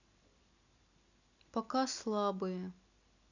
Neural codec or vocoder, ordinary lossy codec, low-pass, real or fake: none; none; 7.2 kHz; real